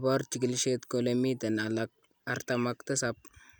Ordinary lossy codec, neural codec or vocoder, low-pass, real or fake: none; none; none; real